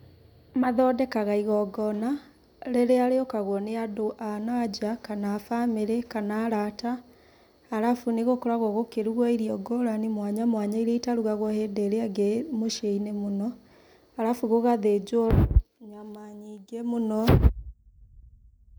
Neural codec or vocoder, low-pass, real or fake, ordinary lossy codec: none; none; real; none